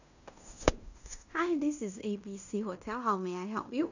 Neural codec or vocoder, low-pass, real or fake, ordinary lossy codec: codec, 16 kHz in and 24 kHz out, 0.9 kbps, LongCat-Audio-Codec, fine tuned four codebook decoder; 7.2 kHz; fake; none